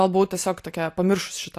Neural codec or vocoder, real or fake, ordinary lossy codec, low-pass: none; real; AAC, 64 kbps; 14.4 kHz